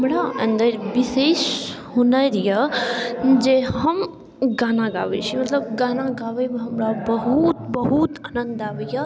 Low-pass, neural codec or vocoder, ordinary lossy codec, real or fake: none; none; none; real